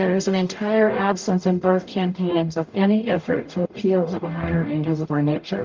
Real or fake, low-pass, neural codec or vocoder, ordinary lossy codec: fake; 7.2 kHz; codec, 44.1 kHz, 0.9 kbps, DAC; Opus, 32 kbps